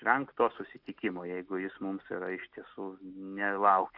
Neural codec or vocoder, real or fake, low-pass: none; real; 5.4 kHz